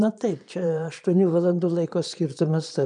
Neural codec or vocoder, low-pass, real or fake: vocoder, 22.05 kHz, 80 mel bands, Vocos; 9.9 kHz; fake